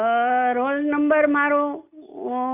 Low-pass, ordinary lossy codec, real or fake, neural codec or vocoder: 3.6 kHz; none; real; none